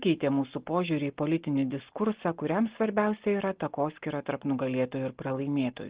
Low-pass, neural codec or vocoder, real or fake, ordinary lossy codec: 3.6 kHz; none; real; Opus, 16 kbps